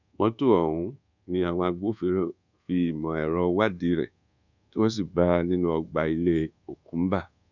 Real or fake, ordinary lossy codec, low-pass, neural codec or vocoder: fake; none; 7.2 kHz; codec, 24 kHz, 1.2 kbps, DualCodec